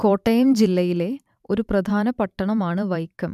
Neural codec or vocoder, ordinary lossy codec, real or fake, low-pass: vocoder, 44.1 kHz, 128 mel bands every 512 samples, BigVGAN v2; none; fake; 14.4 kHz